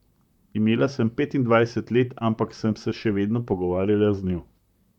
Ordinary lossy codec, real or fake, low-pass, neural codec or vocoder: none; fake; 19.8 kHz; vocoder, 44.1 kHz, 128 mel bands, Pupu-Vocoder